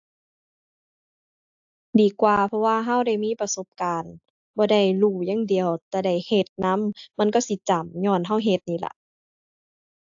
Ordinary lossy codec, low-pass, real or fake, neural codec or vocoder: AAC, 64 kbps; 7.2 kHz; real; none